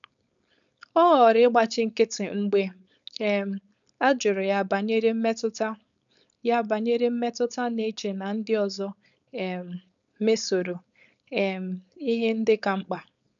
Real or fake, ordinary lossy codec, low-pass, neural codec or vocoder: fake; none; 7.2 kHz; codec, 16 kHz, 4.8 kbps, FACodec